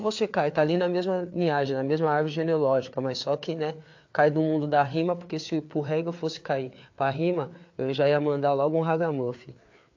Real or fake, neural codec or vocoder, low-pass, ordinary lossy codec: fake; codec, 16 kHz, 4 kbps, FreqCodec, larger model; 7.2 kHz; AAC, 48 kbps